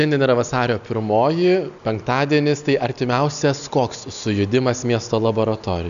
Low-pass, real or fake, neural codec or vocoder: 7.2 kHz; real; none